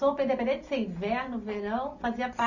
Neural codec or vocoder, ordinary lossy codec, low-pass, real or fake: none; none; 7.2 kHz; real